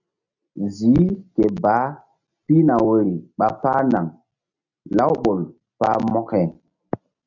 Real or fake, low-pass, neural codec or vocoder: real; 7.2 kHz; none